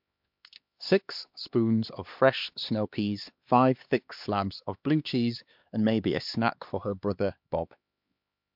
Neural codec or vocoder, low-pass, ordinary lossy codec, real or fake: codec, 16 kHz, 2 kbps, X-Codec, HuBERT features, trained on LibriSpeech; 5.4 kHz; MP3, 48 kbps; fake